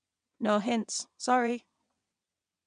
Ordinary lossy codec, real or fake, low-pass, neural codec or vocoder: none; fake; 9.9 kHz; vocoder, 22.05 kHz, 80 mel bands, WaveNeXt